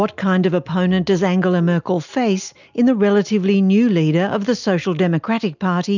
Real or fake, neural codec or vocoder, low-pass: real; none; 7.2 kHz